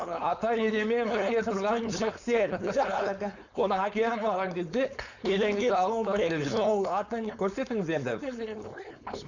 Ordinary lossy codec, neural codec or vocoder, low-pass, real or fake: none; codec, 16 kHz, 4.8 kbps, FACodec; 7.2 kHz; fake